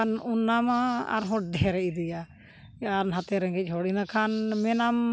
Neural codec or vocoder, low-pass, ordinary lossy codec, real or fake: none; none; none; real